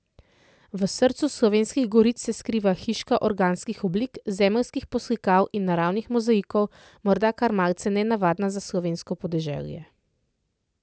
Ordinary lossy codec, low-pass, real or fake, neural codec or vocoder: none; none; real; none